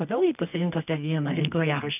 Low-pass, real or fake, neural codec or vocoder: 3.6 kHz; fake; codec, 24 kHz, 0.9 kbps, WavTokenizer, medium music audio release